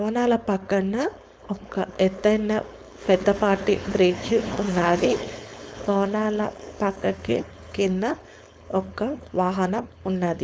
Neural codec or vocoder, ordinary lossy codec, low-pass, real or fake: codec, 16 kHz, 4.8 kbps, FACodec; none; none; fake